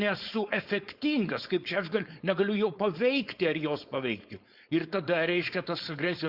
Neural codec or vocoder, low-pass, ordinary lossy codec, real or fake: codec, 16 kHz, 4.8 kbps, FACodec; 5.4 kHz; Opus, 64 kbps; fake